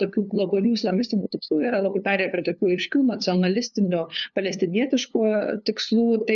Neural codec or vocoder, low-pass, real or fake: codec, 16 kHz, 2 kbps, FunCodec, trained on LibriTTS, 25 frames a second; 7.2 kHz; fake